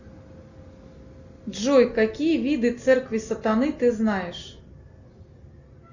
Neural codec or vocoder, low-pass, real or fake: none; 7.2 kHz; real